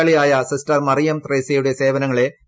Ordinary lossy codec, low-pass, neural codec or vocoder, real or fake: none; none; none; real